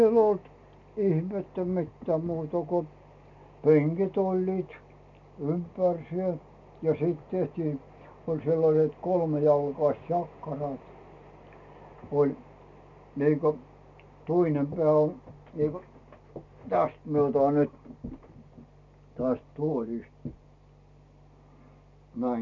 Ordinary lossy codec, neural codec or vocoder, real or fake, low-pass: MP3, 64 kbps; none; real; 7.2 kHz